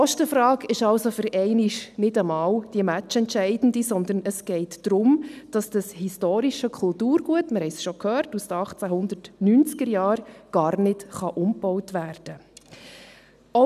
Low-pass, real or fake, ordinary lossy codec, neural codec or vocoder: 14.4 kHz; real; none; none